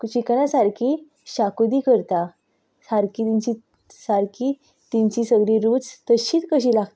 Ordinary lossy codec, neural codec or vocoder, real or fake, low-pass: none; none; real; none